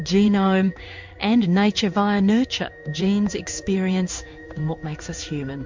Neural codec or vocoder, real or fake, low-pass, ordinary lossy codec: codec, 16 kHz in and 24 kHz out, 1 kbps, XY-Tokenizer; fake; 7.2 kHz; MP3, 64 kbps